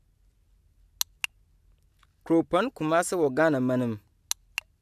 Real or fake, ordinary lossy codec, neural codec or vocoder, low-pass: real; AAC, 96 kbps; none; 14.4 kHz